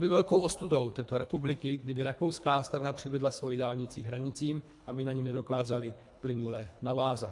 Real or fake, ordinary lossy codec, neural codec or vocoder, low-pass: fake; AAC, 64 kbps; codec, 24 kHz, 1.5 kbps, HILCodec; 10.8 kHz